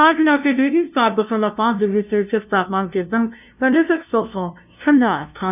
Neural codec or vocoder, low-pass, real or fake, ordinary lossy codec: codec, 16 kHz, 0.5 kbps, FunCodec, trained on LibriTTS, 25 frames a second; 3.6 kHz; fake; none